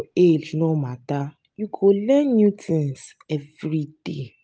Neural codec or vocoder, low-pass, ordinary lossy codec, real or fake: none; none; none; real